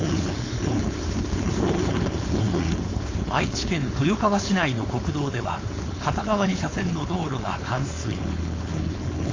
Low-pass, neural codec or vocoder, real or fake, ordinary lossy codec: 7.2 kHz; codec, 16 kHz, 4.8 kbps, FACodec; fake; AAC, 32 kbps